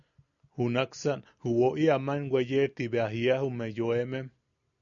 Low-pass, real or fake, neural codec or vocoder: 7.2 kHz; real; none